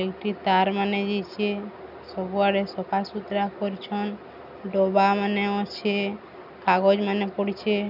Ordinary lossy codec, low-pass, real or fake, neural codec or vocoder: none; 5.4 kHz; real; none